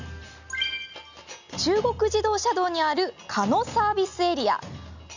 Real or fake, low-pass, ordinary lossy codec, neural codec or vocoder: real; 7.2 kHz; none; none